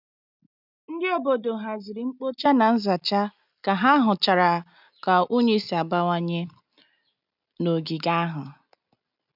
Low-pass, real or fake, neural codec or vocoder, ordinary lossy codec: 5.4 kHz; real; none; none